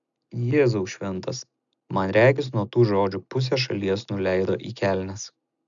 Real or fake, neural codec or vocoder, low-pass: real; none; 7.2 kHz